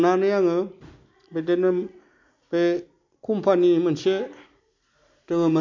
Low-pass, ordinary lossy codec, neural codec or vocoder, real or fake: 7.2 kHz; MP3, 48 kbps; none; real